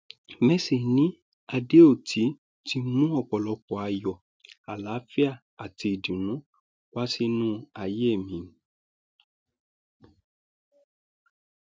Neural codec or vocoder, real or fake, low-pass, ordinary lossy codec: none; real; none; none